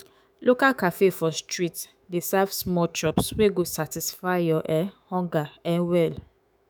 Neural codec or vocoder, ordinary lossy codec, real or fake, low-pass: autoencoder, 48 kHz, 128 numbers a frame, DAC-VAE, trained on Japanese speech; none; fake; none